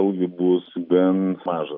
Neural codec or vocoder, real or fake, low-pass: none; real; 5.4 kHz